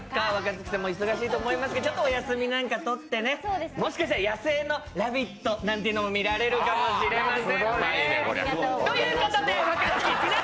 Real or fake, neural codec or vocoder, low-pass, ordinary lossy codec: real; none; none; none